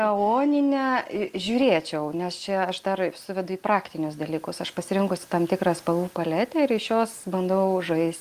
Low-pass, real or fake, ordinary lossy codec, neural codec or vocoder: 14.4 kHz; real; Opus, 24 kbps; none